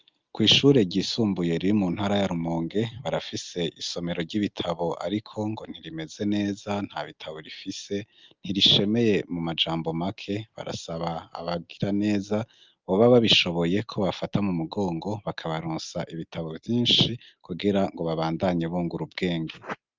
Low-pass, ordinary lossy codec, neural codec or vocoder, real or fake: 7.2 kHz; Opus, 24 kbps; none; real